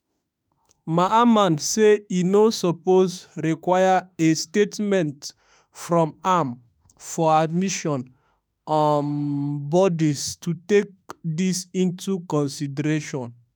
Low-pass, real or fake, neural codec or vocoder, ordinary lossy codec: none; fake; autoencoder, 48 kHz, 32 numbers a frame, DAC-VAE, trained on Japanese speech; none